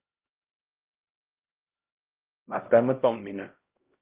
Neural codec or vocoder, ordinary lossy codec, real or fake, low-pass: codec, 16 kHz, 0.5 kbps, X-Codec, HuBERT features, trained on LibriSpeech; Opus, 16 kbps; fake; 3.6 kHz